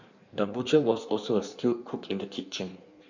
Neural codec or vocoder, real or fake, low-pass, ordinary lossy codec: codec, 16 kHz in and 24 kHz out, 1.1 kbps, FireRedTTS-2 codec; fake; 7.2 kHz; none